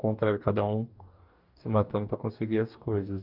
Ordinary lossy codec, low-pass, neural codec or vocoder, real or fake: Opus, 24 kbps; 5.4 kHz; codec, 44.1 kHz, 2.6 kbps, DAC; fake